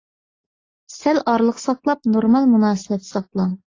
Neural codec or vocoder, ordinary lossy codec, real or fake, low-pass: none; AAC, 32 kbps; real; 7.2 kHz